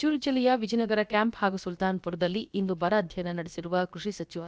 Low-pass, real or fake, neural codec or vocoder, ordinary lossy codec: none; fake; codec, 16 kHz, about 1 kbps, DyCAST, with the encoder's durations; none